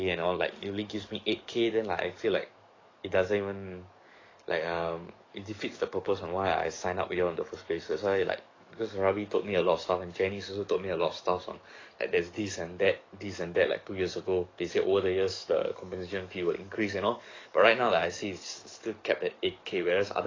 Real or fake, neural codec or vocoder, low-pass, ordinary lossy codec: fake; codec, 44.1 kHz, 7.8 kbps, DAC; 7.2 kHz; AAC, 32 kbps